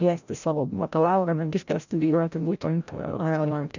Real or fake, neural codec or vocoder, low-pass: fake; codec, 16 kHz, 0.5 kbps, FreqCodec, larger model; 7.2 kHz